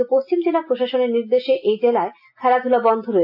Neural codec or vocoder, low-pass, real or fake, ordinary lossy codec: none; 5.4 kHz; real; AAC, 48 kbps